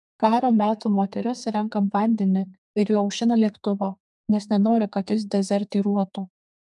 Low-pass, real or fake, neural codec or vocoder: 10.8 kHz; fake; codec, 32 kHz, 1.9 kbps, SNAC